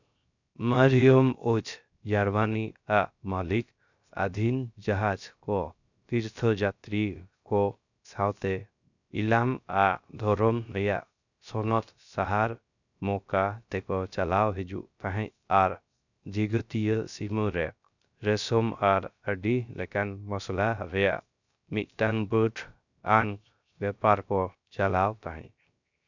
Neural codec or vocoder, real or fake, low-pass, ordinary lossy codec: codec, 16 kHz, 0.3 kbps, FocalCodec; fake; 7.2 kHz; none